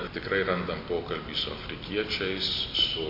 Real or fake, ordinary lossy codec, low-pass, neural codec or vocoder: real; MP3, 32 kbps; 5.4 kHz; none